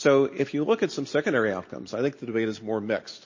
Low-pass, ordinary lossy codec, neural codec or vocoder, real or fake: 7.2 kHz; MP3, 32 kbps; none; real